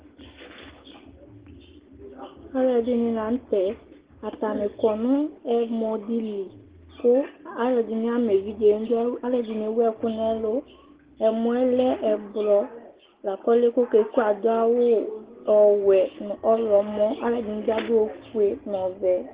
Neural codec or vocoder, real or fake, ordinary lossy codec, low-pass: none; real; Opus, 16 kbps; 3.6 kHz